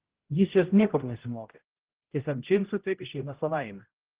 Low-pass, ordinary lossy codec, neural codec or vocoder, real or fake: 3.6 kHz; Opus, 16 kbps; codec, 16 kHz, 0.5 kbps, X-Codec, HuBERT features, trained on general audio; fake